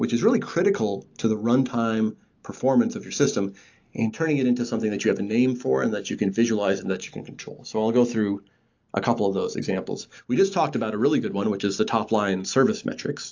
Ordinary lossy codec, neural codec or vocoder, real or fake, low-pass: AAC, 48 kbps; none; real; 7.2 kHz